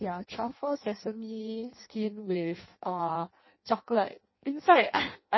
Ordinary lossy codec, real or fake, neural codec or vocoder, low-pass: MP3, 24 kbps; fake; codec, 16 kHz in and 24 kHz out, 0.6 kbps, FireRedTTS-2 codec; 7.2 kHz